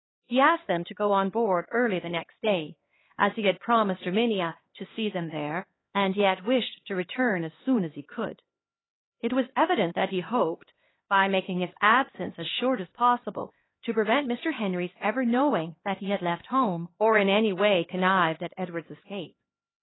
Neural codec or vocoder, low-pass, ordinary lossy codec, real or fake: codec, 16 kHz, 2 kbps, X-Codec, HuBERT features, trained on LibriSpeech; 7.2 kHz; AAC, 16 kbps; fake